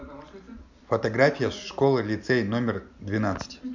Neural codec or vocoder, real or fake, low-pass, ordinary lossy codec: none; real; 7.2 kHz; none